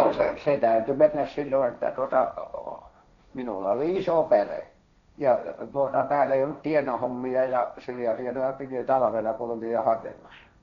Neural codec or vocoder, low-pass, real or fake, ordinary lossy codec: codec, 16 kHz, 1.1 kbps, Voila-Tokenizer; 7.2 kHz; fake; none